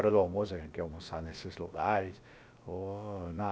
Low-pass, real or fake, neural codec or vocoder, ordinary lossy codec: none; fake; codec, 16 kHz, about 1 kbps, DyCAST, with the encoder's durations; none